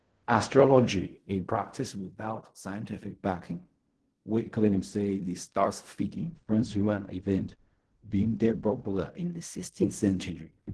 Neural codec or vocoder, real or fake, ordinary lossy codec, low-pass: codec, 16 kHz in and 24 kHz out, 0.4 kbps, LongCat-Audio-Codec, fine tuned four codebook decoder; fake; Opus, 16 kbps; 10.8 kHz